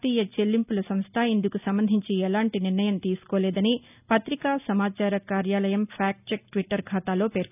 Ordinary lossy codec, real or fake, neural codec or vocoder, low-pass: none; real; none; 3.6 kHz